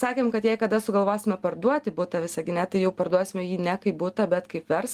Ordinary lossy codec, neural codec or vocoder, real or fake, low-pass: Opus, 24 kbps; none; real; 14.4 kHz